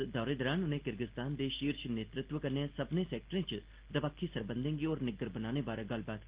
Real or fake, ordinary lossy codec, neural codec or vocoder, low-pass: real; Opus, 16 kbps; none; 3.6 kHz